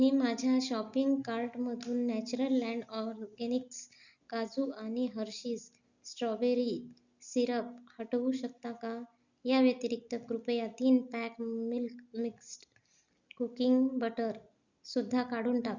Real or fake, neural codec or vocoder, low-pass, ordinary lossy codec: real; none; 7.2 kHz; Opus, 64 kbps